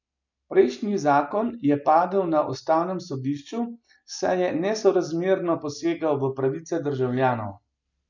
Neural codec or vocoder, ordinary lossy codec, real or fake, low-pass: none; none; real; 7.2 kHz